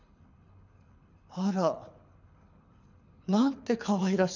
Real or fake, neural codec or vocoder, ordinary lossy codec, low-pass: fake; codec, 24 kHz, 6 kbps, HILCodec; none; 7.2 kHz